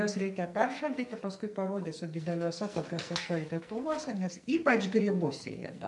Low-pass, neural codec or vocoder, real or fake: 10.8 kHz; codec, 44.1 kHz, 2.6 kbps, SNAC; fake